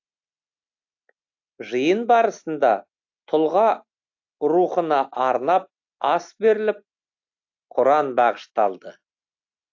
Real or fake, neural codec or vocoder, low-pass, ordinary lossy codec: real; none; 7.2 kHz; AAC, 48 kbps